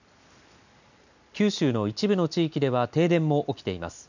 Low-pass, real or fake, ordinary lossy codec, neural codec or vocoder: 7.2 kHz; real; none; none